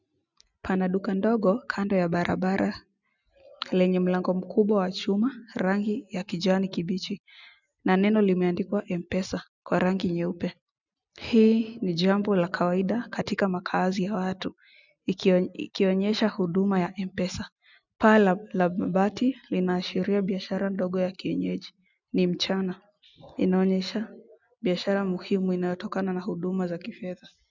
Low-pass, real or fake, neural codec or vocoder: 7.2 kHz; real; none